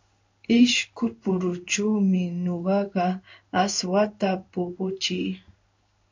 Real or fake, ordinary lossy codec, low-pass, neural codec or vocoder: fake; MP3, 64 kbps; 7.2 kHz; codec, 16 kHz in and 24 kHz out, 1 kbps, XY-Tokenizer